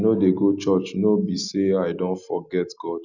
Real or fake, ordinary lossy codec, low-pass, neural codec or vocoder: real; none; 7.2 kHz; none